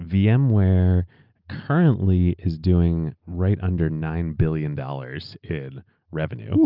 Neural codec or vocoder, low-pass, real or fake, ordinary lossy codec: none; 5.4 kHz; real; Opus, 24 kbps